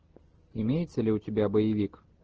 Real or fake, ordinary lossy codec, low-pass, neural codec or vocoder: real; Opus, 16 kbps; 7.2 kHz; none